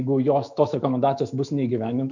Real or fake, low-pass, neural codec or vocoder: fake; 7.2 kHz; autoencoder, 48 kHz, 128 numbers a frame, DAC-VAE, trained on Japanese speech